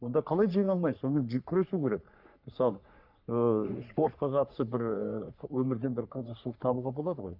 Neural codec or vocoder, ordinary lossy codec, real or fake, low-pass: codec, 44.1 kHz, 3.4 kbps, Pupu-Codec; none; fake; 5.4 kHz